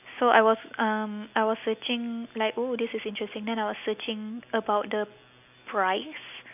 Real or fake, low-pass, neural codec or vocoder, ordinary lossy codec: real; 3.6 kHz; none; AAC, 32 kbps